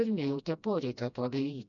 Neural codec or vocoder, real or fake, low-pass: codec, 16 kHz, 1 kbps, FreqCodec, smaller model; fake; 7.2 kHz